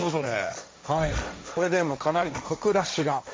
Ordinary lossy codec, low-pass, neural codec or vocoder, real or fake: none; none; codec, 16 kHz, 1.1 kbps, Voila-Tokenizer; fake